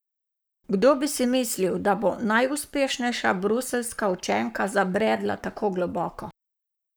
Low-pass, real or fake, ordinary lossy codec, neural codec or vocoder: none; fake; none; codec, 44.1 kHz, 7.8 kbps, Pupu-Codec